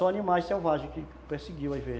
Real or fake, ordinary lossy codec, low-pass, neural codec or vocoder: real; none; none; none